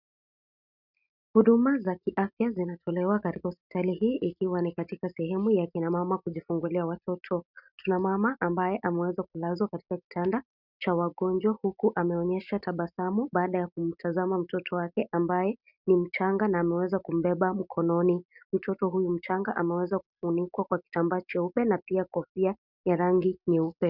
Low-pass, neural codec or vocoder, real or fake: 5.4 kHz; none; real